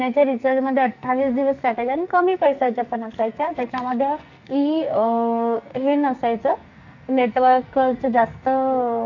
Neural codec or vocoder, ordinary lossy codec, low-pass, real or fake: codec, 44.1 kHz, 2.6 kbps, SNAC; none; 7.2 kHz; fake